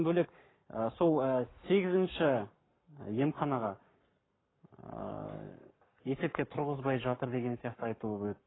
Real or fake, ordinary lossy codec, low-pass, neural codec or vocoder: fake; AAC, 16 kbps; 7.2 kHz; codec, 44.1 kHz, 7.8 kbps, Pupu-Codec